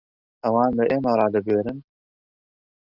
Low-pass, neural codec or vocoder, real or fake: 5.4 kHz; none; real